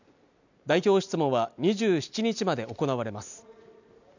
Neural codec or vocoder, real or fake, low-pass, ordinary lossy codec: none; real; 7.2 kHz; none